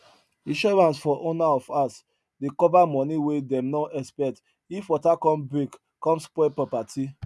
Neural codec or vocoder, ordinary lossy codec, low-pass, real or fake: none; none; none; real